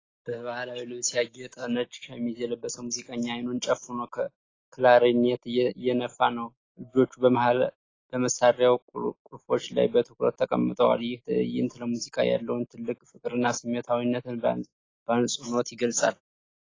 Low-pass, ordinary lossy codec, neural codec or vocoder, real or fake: 7.2 kHz; AAC, 32 kbps; none; real